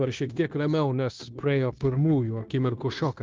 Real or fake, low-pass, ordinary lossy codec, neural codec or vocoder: fake; 7.2 kHz; Opus, 32 kbps; codec, 16 kHz, 0.9 kbps, LongCat-Audio-Codec